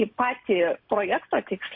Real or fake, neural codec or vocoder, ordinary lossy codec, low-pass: real; none; MP3, 32 kbps; 5.4 kHz